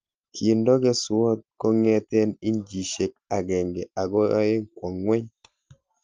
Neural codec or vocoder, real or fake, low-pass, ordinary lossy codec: none; real; 7.2 kHz; Opus, 24 kbps